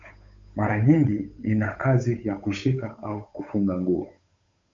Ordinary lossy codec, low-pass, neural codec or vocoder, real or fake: MP3, 48 kbps; 7.2 kHz; codec, 16 kHz, 8 kbps, FunCodec, trained on Chinese and English, 25 frames a second; fake